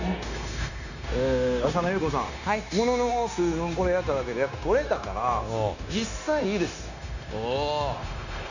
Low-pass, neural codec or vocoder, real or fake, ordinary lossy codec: 7.2 kHz; codec, 16 kHz, 0.9 kbps, LongCat-Audio-Codec; fake; none